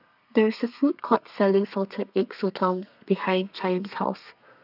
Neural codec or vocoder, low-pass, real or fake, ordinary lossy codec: codec, 32 kHz, 1.9 kbps, SNAC; 5.4 kHz; fake; none